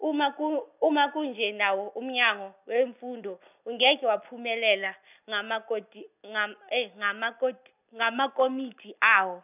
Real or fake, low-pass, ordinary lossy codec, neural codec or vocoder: real; 3.6 kHz; none; none